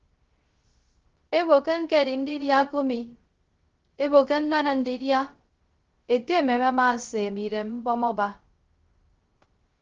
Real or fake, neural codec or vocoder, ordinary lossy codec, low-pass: fake; codec, 16 kHz, 0.3 kbps, FocalCodec; Opus, 16 kbps; 7.2 kHz